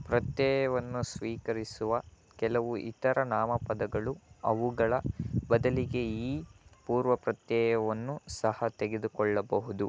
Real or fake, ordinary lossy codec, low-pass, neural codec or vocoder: real; none; none; none